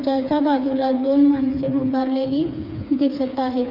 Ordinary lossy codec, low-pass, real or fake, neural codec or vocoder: none; 5.4 kHz; fake; codec, 16 kHz, 4 kbps, FreqCodec, smaller model